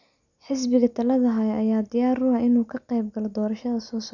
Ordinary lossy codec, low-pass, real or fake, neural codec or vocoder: none; 7.2 kHz; real; none